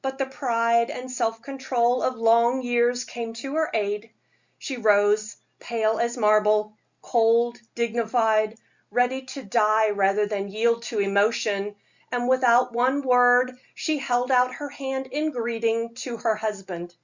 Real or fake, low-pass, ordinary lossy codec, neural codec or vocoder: real; 7.2 kHz; Opus, 64 kbps; none